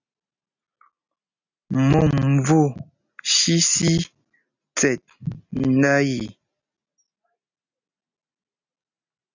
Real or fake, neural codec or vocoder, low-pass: real; none; 7.2 kHz